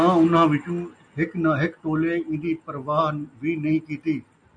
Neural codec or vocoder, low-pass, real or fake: none; 9.9 kHz; real